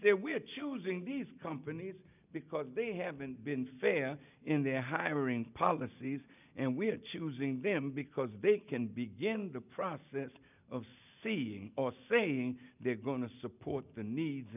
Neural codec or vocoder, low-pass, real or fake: none; 3.6 kHz; real